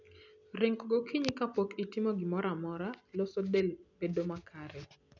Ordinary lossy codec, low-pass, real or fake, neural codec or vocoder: none; 7.2 kHz; real; none